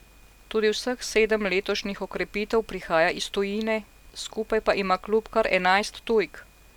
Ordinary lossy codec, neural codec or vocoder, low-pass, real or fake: none; none; 19.8 kHz; real